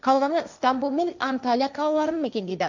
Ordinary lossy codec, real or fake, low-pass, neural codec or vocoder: none; fake; 7.2 kHz; codec, 16 kHz, 1.1 kbps, Voila-Tokenizer